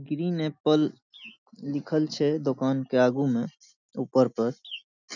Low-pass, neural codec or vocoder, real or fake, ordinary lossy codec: 7.2 kHz; none; real; none